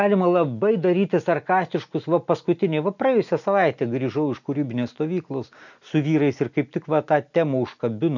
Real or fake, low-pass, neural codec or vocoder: real; 7.2 kHz; none